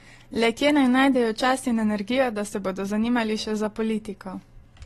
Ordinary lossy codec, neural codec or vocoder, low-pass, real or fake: AAC, 32 kbps; none; 19.8 kHz; real